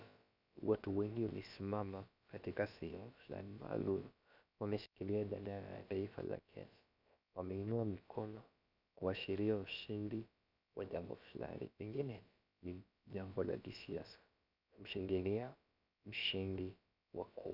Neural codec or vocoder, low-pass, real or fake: codec, 16 kHz, about 1 kbps, DyCAST, with the encoder's durations; 5.4 kHz; fake